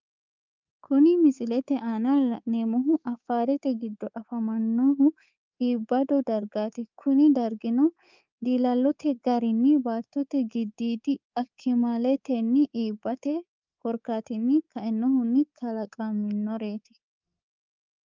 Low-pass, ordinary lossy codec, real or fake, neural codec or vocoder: 7.2 kHz; Opus, 24 kbps; fake; codec, 24 kHz, 3.1 kbps, DualCodec